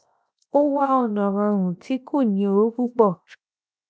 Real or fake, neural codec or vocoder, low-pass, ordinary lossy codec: fake; codec, 16 kHz, 0.7 kbps, FocalCodec; none; none